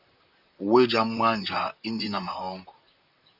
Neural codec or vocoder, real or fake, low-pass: vocoder, 44.1 kHz, 128 mel bands, Pupu-Vocoder; fake; 5.4 kHz